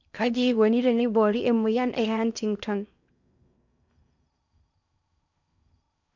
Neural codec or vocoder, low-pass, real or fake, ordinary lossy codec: codec, 16 kHz in and 24 kHz out, 0.8 kbps, FocalCodec, streaming, 65536 codes; 7.2 kHz; fake; none